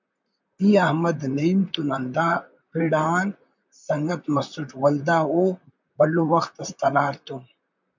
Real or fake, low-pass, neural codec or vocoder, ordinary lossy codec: fake; 7.2 kHz; vocoder, 44.1 kHz, 128 mel bands, Pupu-Vocoder; MP3, 64 kbps